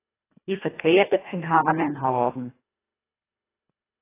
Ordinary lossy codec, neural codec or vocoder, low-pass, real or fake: AAC, 16 kbps; codec, 24 kHz, 1.5 kbps, HILCodec; 3.6 kHz; fake